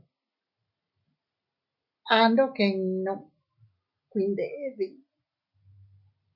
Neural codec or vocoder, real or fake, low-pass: none; real; 5.4 kHz